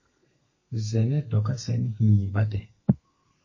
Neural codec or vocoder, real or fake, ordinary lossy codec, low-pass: codec, 32 kHz, 1.9 kbps, SNAC; fake; MP3, 32 kbps; 7.2 kHz